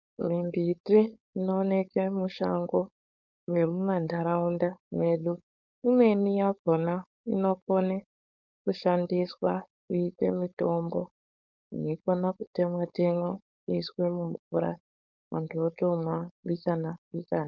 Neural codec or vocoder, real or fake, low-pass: codec, 16 kHz, 4.8 kbps, FACodec; fake; 7.2 kHz